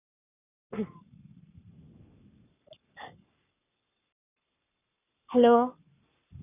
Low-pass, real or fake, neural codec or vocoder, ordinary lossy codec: 3.6 kHz; fake; codec, 44.1 kHz, 7.8 kbps, Pupu-Codec; none